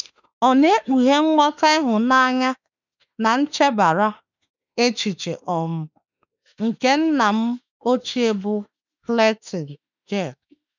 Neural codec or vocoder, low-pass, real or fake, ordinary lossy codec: autoencoder, 48 kHz, 32 numbers a frame, DAC-VAE, trained on Japanese speech; 7.2 kHz; fake; none